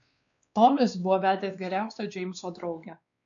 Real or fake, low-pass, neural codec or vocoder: fake; 7.2 kHz; codec, 16 kHz, 2 kbps, X-Codec, WavLM features, trained on Multilingual LibriSpeech